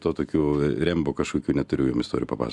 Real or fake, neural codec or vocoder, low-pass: real; none; 10.8 kHz